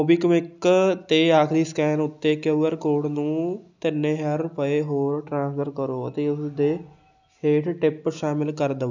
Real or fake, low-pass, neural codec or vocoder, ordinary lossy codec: real; 7.2 kHz; none; none